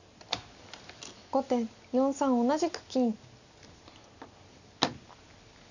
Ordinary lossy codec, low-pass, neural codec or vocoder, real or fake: none; 7.2 kHz; none; real